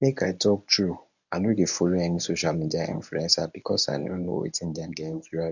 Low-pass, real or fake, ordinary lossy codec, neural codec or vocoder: 7.2 kHz; fake; none; codec, 24 kHz, 0.9 kbps, WavTokenizer, medium speech release version 1